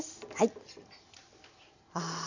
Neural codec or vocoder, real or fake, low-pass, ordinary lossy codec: none; real; 7.2 kHz; none